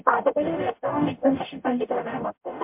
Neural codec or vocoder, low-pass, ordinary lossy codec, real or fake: codec, 44.1 kHz, 0.9 kbps, DAC; 3.6 kHz; MP3, 32 kbps; fake